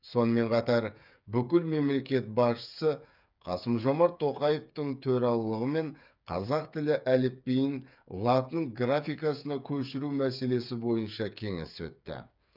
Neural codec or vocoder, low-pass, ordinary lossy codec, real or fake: codec, 16 kHz, 8 kbps, FreqCodec, smaller model; 5.4 kHz; none; fake